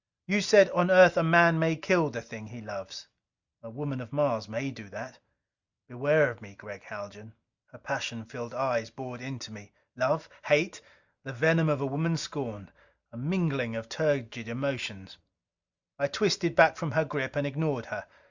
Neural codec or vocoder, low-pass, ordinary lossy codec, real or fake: none; 7.2 kHz; Opus, 64 kbps; real